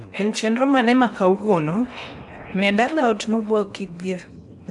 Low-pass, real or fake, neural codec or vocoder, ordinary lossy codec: 10.8 kHz; fake; codec, 16 kHz in and 24 kHz out, 0.8 kbps, FocalCodec, streaming, 65536 codes; none